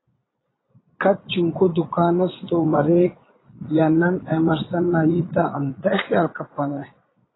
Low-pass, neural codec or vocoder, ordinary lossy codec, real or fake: 7.2 kHz; vocoder, 22.05 kHz, 80 mel bands, Vocos; AAC, 16 kbps; fake